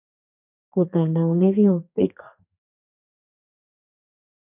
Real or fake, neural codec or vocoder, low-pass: fake; codec, 32 kHz, 1.9 kbps, SNAC; 3.6 kHz